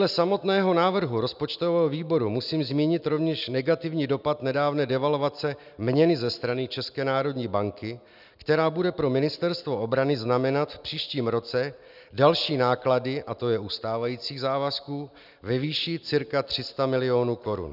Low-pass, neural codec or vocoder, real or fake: 5.4 kHz; none; real